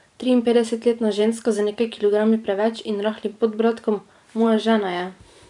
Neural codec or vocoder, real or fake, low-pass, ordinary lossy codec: vocoder, 44.1 kHz, 128 mel bands every 512 samples, BigVGAN v2; fake; 10.8 kHz; none